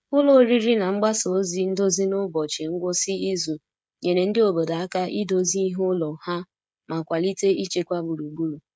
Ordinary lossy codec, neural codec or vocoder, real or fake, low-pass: none; codec, 16 kHz, 16 kbps, FreqCodec, smaller model; fake; none